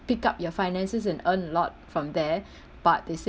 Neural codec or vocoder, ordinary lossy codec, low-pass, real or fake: none; none; none; real